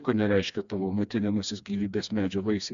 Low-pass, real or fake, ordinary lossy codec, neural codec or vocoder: 7.2 kHz; fake; AAC, 64 kbps; codec, 16 kHz, 2 kbps, FreqCodec, smaller model